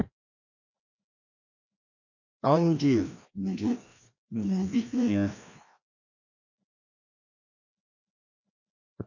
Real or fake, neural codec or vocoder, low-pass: fake; codec, 16 kHz, 1 kbps, FreqCodec, larger model; 7.2 kHz